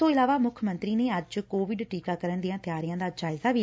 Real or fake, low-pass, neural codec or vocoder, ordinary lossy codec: real; none; none; none